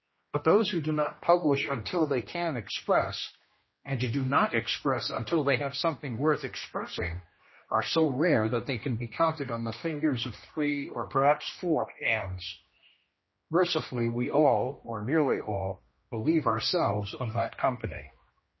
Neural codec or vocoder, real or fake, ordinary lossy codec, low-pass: codec, 16 kHz, 1 kbps, X-Codec, HuBERT features, trained on general audio; fake; MP3, 24 kbps; 7.2 kHz